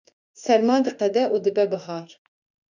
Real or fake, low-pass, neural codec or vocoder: fake; 7.2 kHz; autoencoder, 48 kHz, 32 numbers a frame, DAC-VAE, trained on Japanese speech